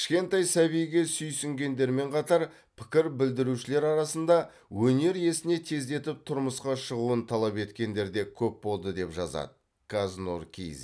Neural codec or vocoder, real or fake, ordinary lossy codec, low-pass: none; real; none; none